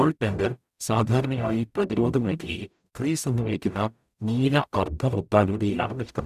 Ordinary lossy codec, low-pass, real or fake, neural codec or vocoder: none; 14.4 kHz; fake; codec, 44.1 kHz, 0.9 kbps, DAC